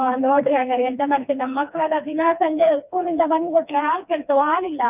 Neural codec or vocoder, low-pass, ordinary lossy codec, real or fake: codec, 16 kHz, 2 kbps, FreqCodec, smaller model; 3.6 kHz; none; fake